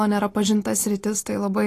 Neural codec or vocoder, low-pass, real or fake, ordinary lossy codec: none; 14.4 kHz; real; AAC, 48 kbps